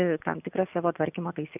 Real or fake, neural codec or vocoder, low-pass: real; none; 3.6 kHz